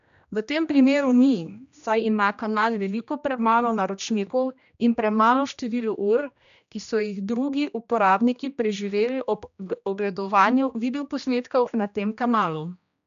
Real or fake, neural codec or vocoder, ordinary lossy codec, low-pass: fake; codec, 16 kHz, 1 kbps, X-Codec, HuBERT features, trained on general audio; none; 7.2 kHz